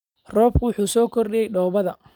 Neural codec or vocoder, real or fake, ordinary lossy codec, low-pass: none; real; none; 19.8 kHz